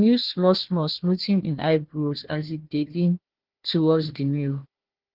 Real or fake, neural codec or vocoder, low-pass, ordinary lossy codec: fake; codec, 16 kHz, 1 kbps, FunCodec, trained on Chinese and English, 50 frames a second; 5.4 kHz; Opus, 16 kbps